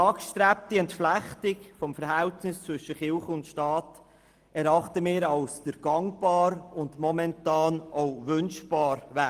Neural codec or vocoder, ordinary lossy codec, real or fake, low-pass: none; Opus, 24 kbps; real; 14.4 kHz